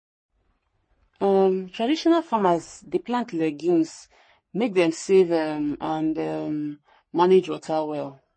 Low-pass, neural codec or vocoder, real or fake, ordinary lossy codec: 9.9 kHz; codec, 44.1 kHz, 3.4 kbps, Pupu-Codec; fake; MP3, 32 kbps